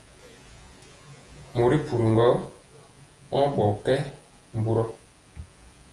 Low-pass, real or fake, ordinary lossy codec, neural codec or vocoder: 10.8 kHz; fake; Opus, 32 kbps; vocoder, 48 kHz, 128 mel bands, Vocos